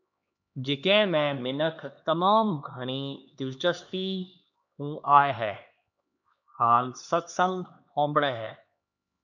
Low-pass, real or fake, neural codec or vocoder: 7.2 kHz; fake; codec, 16 kHz, 2 kbps, X-Codec, HuBERT features, trained on LibriSpeech